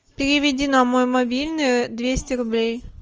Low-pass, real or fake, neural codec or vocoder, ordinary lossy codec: 7.2 kHz; real; none; Opus, 24 kbps